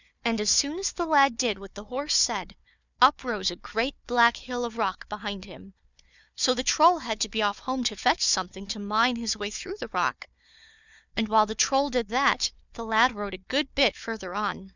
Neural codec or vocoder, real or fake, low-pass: codec, 16 kHz, 4 kbps, FunCodec, trained on Chinese and English, 50 frames a second; fake; 7.2 kHz